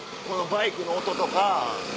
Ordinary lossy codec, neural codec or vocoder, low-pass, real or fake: none; none; none; real